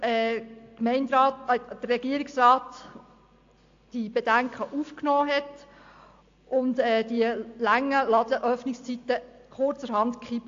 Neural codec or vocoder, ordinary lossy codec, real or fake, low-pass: none; AAC, 48 kbps; real; 7.2 kHz